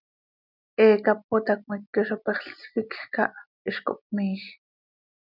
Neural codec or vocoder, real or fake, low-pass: none; real; 5.4 kHz